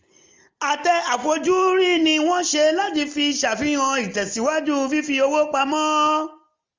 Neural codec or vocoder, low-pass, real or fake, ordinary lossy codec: none; 7.2 kHz; real; Opus, 32 kbps